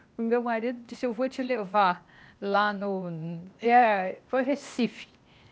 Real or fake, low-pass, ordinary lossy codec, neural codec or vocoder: fake; none; none; codec, 16 kHz, 0.8 kbps, ZipCodec